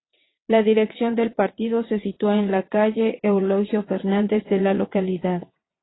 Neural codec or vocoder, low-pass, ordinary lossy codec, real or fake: vocoder, 22.05 kHz, 80 mel bands, WaveNeXt; 7.2 kHz; AAC, 16 kbps; fake